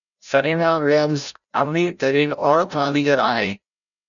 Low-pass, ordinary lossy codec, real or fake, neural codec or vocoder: 7.2 kHz; MP3, 96 kbps; fake; codec, 16 kHz, 0.5 kbps, FreqCodec, larger model